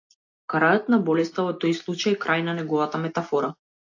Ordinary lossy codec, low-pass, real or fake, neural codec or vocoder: AAC, 48 kbps; 7.2 kHz; real; none